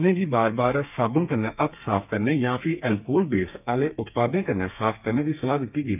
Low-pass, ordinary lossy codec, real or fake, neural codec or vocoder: 3.6 kHz; none; fake; codec, 32 kHz, 1.9 kbps, SNAC